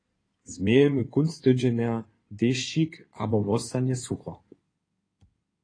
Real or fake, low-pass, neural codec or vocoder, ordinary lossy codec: fake; 9.9 kHz; codec, 16 kHz in and 24 kHz out, 2.2 kbps, FireRedTTS-2 codec; AAC, 32 kbps